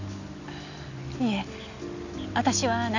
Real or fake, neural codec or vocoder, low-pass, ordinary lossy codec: real; none; 7.2 kHz; none